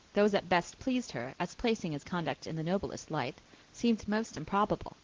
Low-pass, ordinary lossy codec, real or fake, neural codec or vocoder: 7.2 kHz; Opus, 16 kbps; real; none